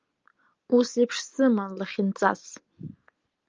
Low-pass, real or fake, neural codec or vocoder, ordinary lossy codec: 7.2 kHz; real; none; Opus, 24 kbps